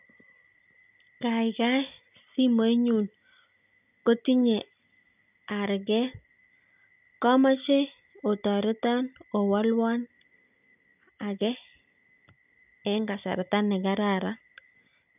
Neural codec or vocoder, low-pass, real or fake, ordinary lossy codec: none; 3.6 kHz; real; none